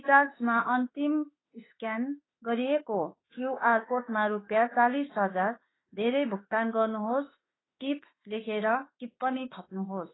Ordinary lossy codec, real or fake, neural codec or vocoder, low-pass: AAC, 16 kbps; fake; autoencoder, 48 kHz, 32 numbers a frame, DAC-VAE, trained on Japanese speech; 7.2 kHz